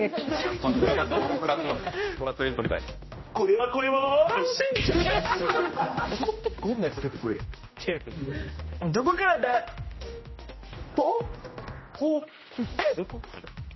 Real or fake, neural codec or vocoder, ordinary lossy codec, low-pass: fake; codec, 16 kHz, 1 kbps, X-Codec, HuBERT features, trained on general audio; MP3, 24 kbps; 7.2 kHz